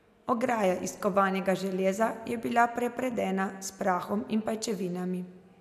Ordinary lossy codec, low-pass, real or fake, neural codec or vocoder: none; 14.4 kHz; real; none